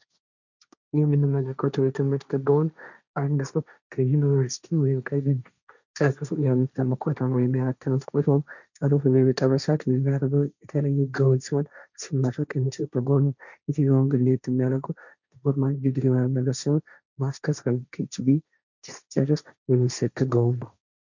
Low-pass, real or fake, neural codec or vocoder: 7.2 kHz; fake; codec, 16 kHz, 1.1 kbps, Voila-Tokenizer